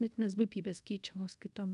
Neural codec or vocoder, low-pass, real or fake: codec, 24 kHz, 0.5 kbps, DualCodec; 10.8 kHz; fake